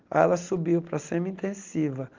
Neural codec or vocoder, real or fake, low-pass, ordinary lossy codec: none; real; 7.2 kHz; Opus, 32 kbps